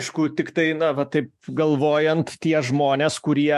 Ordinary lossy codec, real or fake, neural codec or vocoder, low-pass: MP3, 64 kbps; fake; autoencoder, 48 kHz, 128 numbers a frame, DAC-VAE, trained on Japanese speech; 14.4 kHz